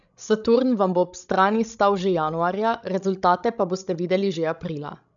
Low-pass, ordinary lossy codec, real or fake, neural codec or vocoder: 7.2 kHz; none; fake; codec, 16 kHz, 16 kbps, FreqCodec, larger model